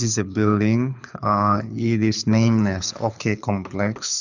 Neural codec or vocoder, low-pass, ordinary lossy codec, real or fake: codec, 24 kHz, 6 kbps, HILCodec; 7.2 kHz; none; fake